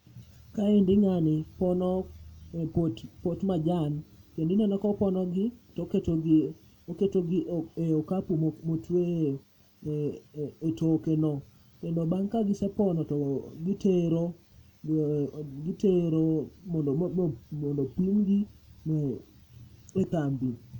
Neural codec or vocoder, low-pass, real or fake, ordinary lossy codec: none; 19.8 kHz; real; none